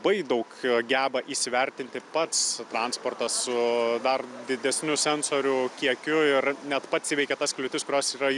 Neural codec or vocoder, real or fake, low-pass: none; real; 10.8 kHz